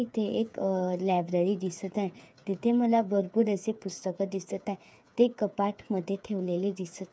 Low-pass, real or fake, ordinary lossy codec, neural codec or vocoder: none; fake; none; codec, 16 kHz, 8 kbps, FreqCodec, smaller model